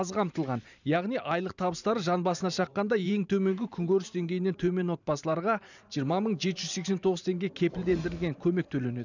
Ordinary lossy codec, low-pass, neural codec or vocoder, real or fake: none; 7.2 kHz; vocoder, 44.1 kHz, 128 mel bands every 512 samples, BigVGAN v2; fake